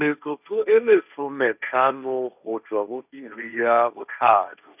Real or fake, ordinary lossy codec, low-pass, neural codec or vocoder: fake; none; 3.6 kHz; codec, 16 kHz, 1.1 kbps, Voila-Tokenizer